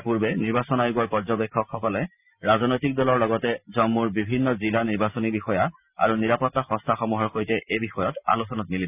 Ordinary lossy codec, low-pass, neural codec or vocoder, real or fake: none; 3.6 kHz; none; real